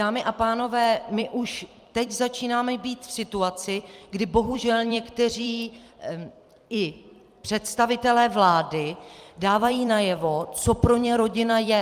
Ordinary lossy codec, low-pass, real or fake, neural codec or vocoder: Opus, 32 kbps; 14.4 kHz; fake; vocoder, 44.1 kHz, 128 mel bands every 256 samples, BigVGAN v2